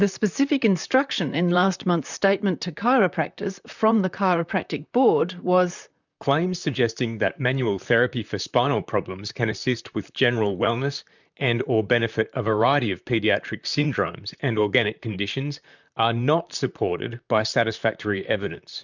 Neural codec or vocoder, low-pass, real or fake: vocoder, 44.1 kHz, 128 mel bands, Pupu-Vocoder; 7.2 kHz; fake